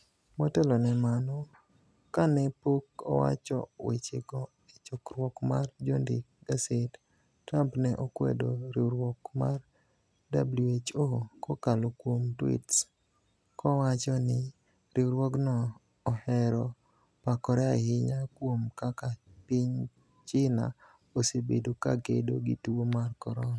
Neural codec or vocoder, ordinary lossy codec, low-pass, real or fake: none; none; none; real